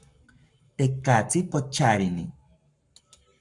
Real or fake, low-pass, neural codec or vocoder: fake; 10.8 kHz; codec, 44.1 kHz, 7.8 kbps, Pupu-Codec